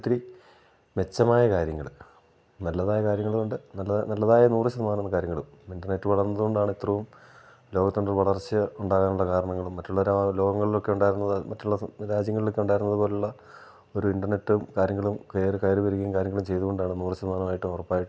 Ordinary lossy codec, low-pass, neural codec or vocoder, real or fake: none; none; none; real